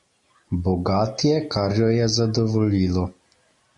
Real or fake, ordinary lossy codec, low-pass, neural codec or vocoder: real; MP3, 48 kbps; 10.8 kHz; none